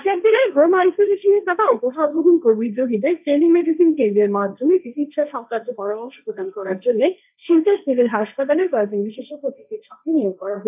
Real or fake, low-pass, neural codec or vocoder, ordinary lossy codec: fake; 3.6 kHz; codec, 16 kHz, 1.1 kbps, Voila-Tokenizer; none